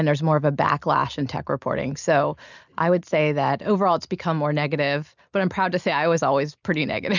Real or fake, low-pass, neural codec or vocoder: real; 7.2 kHz; none